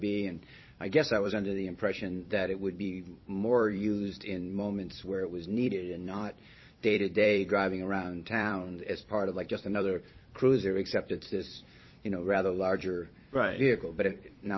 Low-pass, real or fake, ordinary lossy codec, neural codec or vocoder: 7.2 kHz; fake; MP3, 24 kbps; vocoder, 44.1 kHz, 128 mel bands every 256 samples, BigVGAN v2